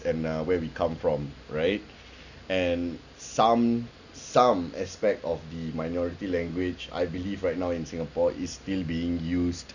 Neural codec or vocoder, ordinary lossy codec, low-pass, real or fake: none; none; 7.2 kHz; real